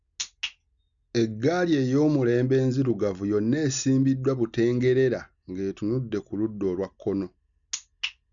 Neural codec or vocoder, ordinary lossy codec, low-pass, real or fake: none; none; 7.2 kHz; real